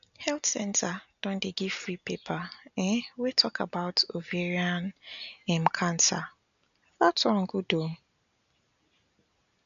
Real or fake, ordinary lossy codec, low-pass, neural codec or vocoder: real; none; 7.2 kHz; none